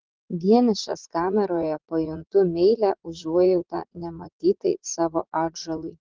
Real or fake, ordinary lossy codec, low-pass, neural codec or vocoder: fake; Opus, 16 kbps; 7.2 kHz; vocoder, 44.1 kHz, 80 mel bands, Vocos